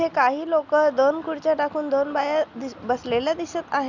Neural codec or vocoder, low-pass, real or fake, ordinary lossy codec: none; 7.2 kHz; real; none